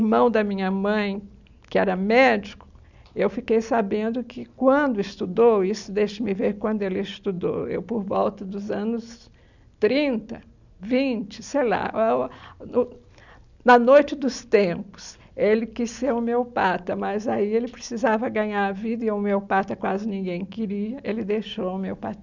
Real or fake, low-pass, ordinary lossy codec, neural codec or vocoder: real; 7.2 kHz; none; none